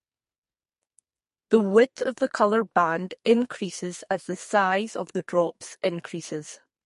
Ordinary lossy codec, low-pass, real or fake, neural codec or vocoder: MP3, 48 kbps; 14.4 kHz; fake; codec, 44.1 kHz, 2.6 kbps, SNAC